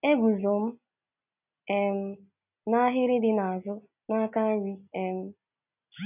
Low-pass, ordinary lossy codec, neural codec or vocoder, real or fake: 3.6 kHz; none; none; real